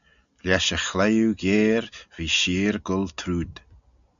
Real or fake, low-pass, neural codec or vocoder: real; 7.2 kHz; none